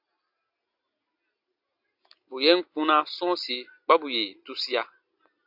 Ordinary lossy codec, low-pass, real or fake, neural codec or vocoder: AAC, 48 kbps; 5.4 kHz; real; none